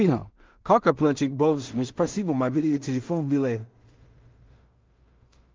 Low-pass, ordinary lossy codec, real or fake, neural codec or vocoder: 7.2 kHz; Opus, 24 kbps; fake; codec, 16 kHz in and 24 kHz out, 0.4 kbps, LongCat-Audio-Codec, two codebook decoder